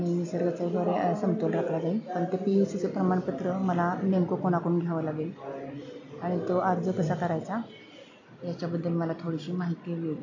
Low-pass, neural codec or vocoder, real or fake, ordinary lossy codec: 7.2 kHz; none; real; AAC, 48 kbps